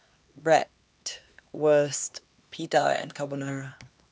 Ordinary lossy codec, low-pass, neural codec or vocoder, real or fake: none; none; codec, 16 kHz, 2 kbps, X-Codec, HuBERT features, trained on LibriSpeech; fake